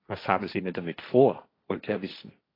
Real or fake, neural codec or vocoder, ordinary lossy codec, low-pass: fake; codec, 16 kHz, 1.1 kbps, Voila-Tokenizer; AAC, 24 kbps; 5.4 kHz